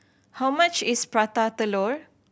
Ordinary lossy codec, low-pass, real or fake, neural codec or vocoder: none; none; real; none